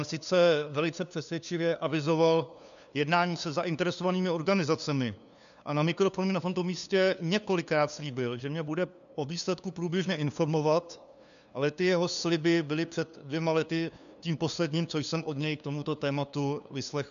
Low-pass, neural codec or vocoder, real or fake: 7.2 kHz; codec, 16 kHz, 2 kbps, FunCodec, trained on LibriTTS, 25 frames a second; fake